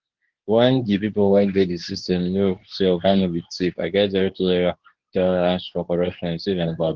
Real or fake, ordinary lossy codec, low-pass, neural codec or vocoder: fake; Opus, 16 kbps; 7.2 kHz; codec, 24 kHz, 0.9 kbps, WavTokenizer, medium speech release version 2